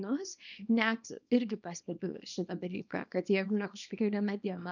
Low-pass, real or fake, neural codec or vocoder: 7.2 kHz; fake; codec, 24 kHz, 0.9 kbps, WavTokenizer, small release